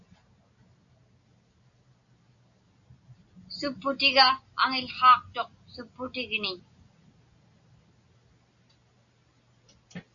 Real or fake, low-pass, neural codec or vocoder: real; 7.2 kHz; none